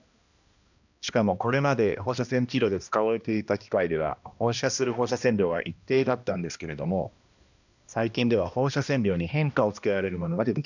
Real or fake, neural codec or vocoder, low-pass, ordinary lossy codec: fake; codec, 16 kHz, 1 kbps, X-Codec, HuBERT features, trained on balanced general audio; 7.2 kHz; none